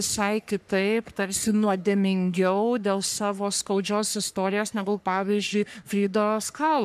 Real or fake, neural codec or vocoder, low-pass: fake; codec, 44.1 kHz, 3.4 kbps, Pupu-Codec; 14.4 kHz